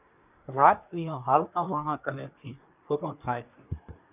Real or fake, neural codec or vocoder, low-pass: fake; codec, 24 kHz, 1 kbps, SNAC; 3.6 kHz